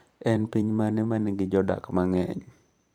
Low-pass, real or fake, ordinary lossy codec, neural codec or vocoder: 19.8 kHz; fake; none; vocoder, 44.1 kHz, 128 mel bands every 512 samples, BigVGAN v2